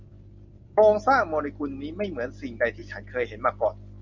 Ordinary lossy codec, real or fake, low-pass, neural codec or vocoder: Opus, 64 kbps; real; 7.2 kHz; none